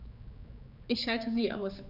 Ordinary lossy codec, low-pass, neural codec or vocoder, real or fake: none; 5.4 kHz; codec, 16 kHz, 4 kbps, X-Codec, HuBERT features, trained on general audio; fake